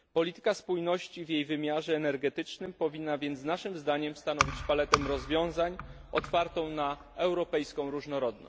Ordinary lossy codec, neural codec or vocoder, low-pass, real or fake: none; none; none; real